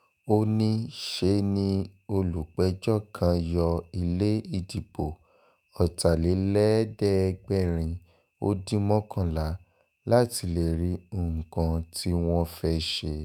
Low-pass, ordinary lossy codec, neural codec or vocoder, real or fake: none; none; autoencoder, 48 kHz, 128 numbers a frame, DAC-VAE, trained on Japanese speech; fake